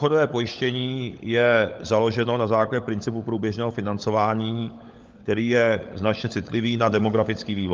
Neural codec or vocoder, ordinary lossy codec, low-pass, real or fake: codec, 16 kHz, 16 kbps, FunCodec, trained on LibriTTS, 50 frames a second; Opus, 24 kbps; 7.2 kHz; fake